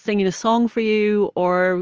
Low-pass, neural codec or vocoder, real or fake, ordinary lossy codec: 7.2 kHz; none; real; Opus, 24 kbps